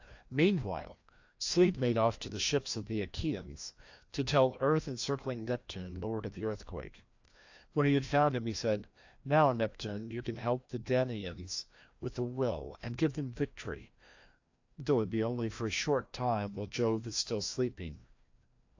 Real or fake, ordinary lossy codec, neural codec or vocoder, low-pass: fake; AAC, 48 kbps; codec, 16 kHz, 1 kbps, FreqCodec, larger model; 7.2 kHz